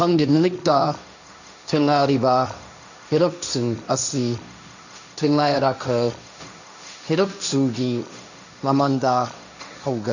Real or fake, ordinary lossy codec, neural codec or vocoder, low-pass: fake; none; codec, 16 kHz, 1.1 kbps, Voila-Tokenizer; 7.2 kHz